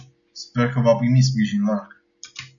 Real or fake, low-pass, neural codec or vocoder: real; 7.2 kHz; none